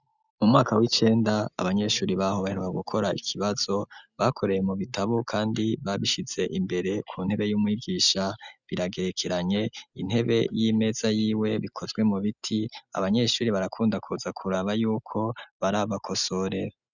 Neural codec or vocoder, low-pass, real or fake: none; 7.2 kHz; real